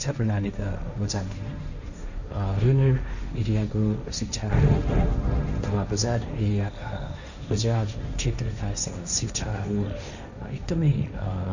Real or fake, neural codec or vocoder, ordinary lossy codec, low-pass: fake; codec, 16 kHz, 1.1 kbps, Voila-Tokenizer; none; 7.2 kHz